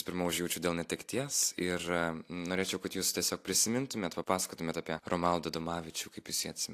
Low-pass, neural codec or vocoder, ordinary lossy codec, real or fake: 14.4 kHz; none; AAC, 64 kbps; real